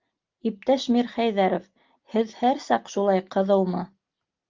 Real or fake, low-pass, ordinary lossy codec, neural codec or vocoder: real; 7.2 kHz; Opus, 32 kbps; none